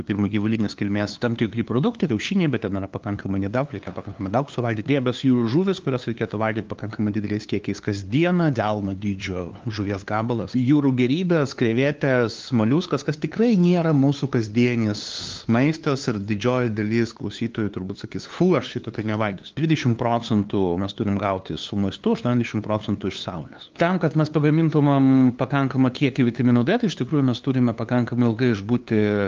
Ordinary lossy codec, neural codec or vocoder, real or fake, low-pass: Opus, 32 kbps; codec, 16 kHz, 2 kbps, FunCodec, trained on LibriTTS, 25 frames a second; fake; 7.2 kHz